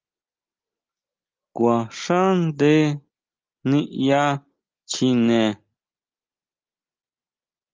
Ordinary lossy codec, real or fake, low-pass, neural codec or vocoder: Opus, 24 kbps; real; 7.2 kHz; none